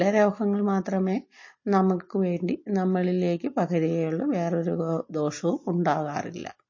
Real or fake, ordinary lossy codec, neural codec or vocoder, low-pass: real; MP3, 32 kbps; none; 7.2 kHz